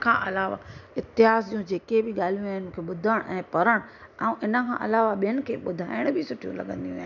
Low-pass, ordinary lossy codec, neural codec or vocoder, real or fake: 7.2 kHz; none; none; real